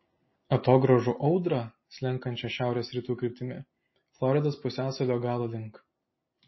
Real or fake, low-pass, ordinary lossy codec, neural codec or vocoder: real; 7.2 kHz; MP3, 24 kbps; none